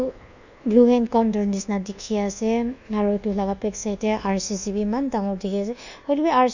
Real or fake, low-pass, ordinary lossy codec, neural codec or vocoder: fake; 7.2 kHz; none; codec, 24 kHz, 1.2 kbps, DualCodec